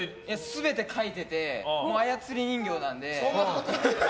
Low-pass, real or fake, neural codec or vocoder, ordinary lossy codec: none; real; none; none